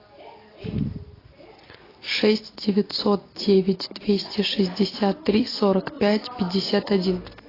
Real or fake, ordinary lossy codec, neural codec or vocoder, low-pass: real; AAC, 24 kbps; none; 5.4 kHz